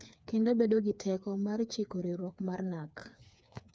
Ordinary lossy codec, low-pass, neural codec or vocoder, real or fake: none; none; codec, 16 kHz, 4 kbps, FreqCodec, smaller model; fake